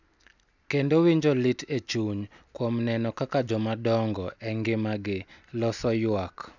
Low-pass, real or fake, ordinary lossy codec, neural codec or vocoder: 7.2 kHz; real; none; none